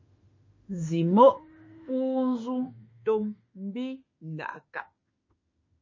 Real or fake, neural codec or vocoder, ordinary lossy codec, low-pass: fake; autoencoder, 48 kHz, 32 numbers a frame, DAC-VAE, trained on Japanese speech; MP3, 32 kbps; 7.2 kHz